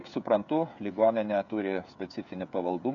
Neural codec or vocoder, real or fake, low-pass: codec, 16 kHz, 16 kbps, FreqCodec, smaller model; fake; 7.2 kHz